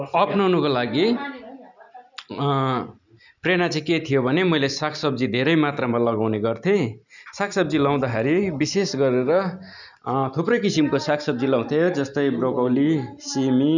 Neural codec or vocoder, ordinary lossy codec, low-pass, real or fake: none; none; 7.2 kHz; real